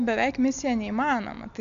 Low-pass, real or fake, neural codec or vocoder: 7.2 kHz; real; none